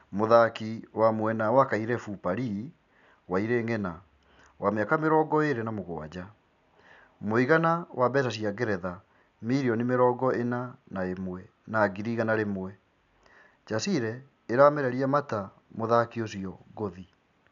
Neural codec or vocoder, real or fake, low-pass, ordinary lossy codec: none; real; 7.2 kHz; none